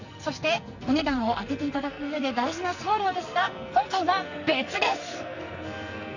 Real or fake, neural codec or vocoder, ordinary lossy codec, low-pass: fake; codec, 44.1 kHz, 2.6 kbps, SNAC; none; 7.2 kHz